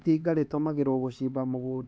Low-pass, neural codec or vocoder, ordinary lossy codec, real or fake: none; codec, 16 kHz, 4 kbps, X-Codec, HuBERT features, trained on LibriSpeech; none; fake